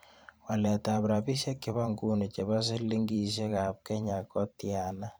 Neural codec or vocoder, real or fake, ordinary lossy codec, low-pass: vocoder, 44.1 kHz, 128 mel bands every 512 samples, BigVGAN v2; fake; none; none